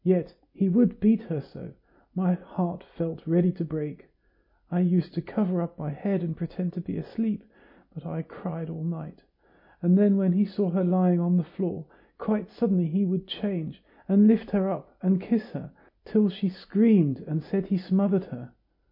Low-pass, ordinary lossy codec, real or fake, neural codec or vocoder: 5.4 kHz; MP3, 32 kbps; real; none